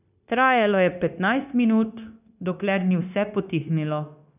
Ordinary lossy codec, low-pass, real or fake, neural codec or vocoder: none; 3.6 kHz; fake; codec, 16 kHz, 0.9 kbps, LongCat-Audio-Codec